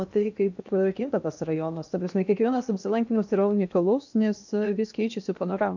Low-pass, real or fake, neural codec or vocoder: 7.2 kHz; fake; codec, 16 kHz in and 24 kHz out, 0.8 kbps, FocalCodec, streaming, 65536 codes